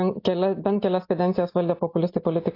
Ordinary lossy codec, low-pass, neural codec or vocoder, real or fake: AAC, 32 kbps; 5.4 kHz; none; real